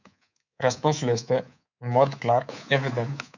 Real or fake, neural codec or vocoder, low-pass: fake; codec, 24 kHz, 3.1 kbps, DualCodec; 7.2 kHz